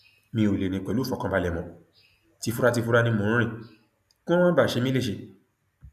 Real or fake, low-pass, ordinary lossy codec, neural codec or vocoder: real; 14.4 kHz; none; none